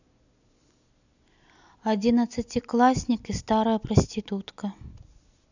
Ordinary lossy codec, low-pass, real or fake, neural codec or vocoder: none; 7.2 kHz; real; none